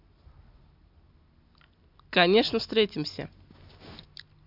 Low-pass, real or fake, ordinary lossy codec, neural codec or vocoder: 5.4 kHz; real; MP3, 48 kbps; none